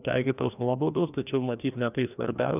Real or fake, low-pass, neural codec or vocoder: fake; 3.6 kHz; codec, 16 kHz, 1 kbps, FreqCodec, larger model